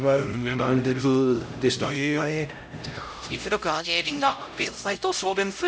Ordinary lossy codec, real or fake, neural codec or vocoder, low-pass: none; fake; codec, 16 kHz, 0.5 kbps, X-Codec, HuBERT features, trained on LibriSpeech; none